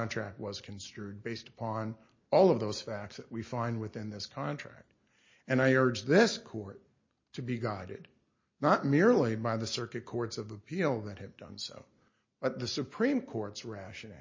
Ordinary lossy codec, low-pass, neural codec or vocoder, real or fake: MP3, 32 kbps; 7.2 kHz; none; real